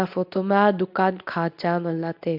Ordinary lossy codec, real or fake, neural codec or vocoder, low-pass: AAC, 48 kbps; fake; codec, 24 kHz, 0.9 kbps, WavTokenizer, medium speech release version 2; 5.4 kHz